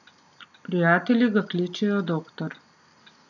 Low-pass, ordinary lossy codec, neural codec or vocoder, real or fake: 7.2 kHz; none; none; real